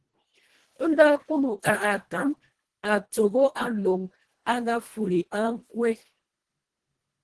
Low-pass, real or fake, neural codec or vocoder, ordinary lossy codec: 10.8 kHz; fake; codec, 24 kHz, 1.5 kbps, HILCodec; Opus, 16 kbps